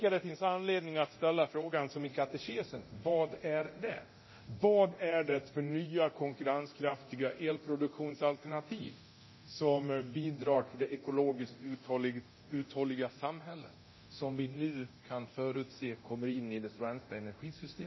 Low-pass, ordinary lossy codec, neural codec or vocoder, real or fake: 7.2 kHz; MP3, 24 kbps; codec, 24 kHz, 0.9 kbps, DualCodec; fake